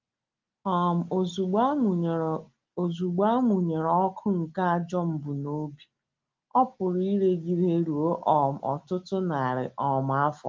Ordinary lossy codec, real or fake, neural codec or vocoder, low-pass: Opus, 32 kbps; real; none; 7.2 kHz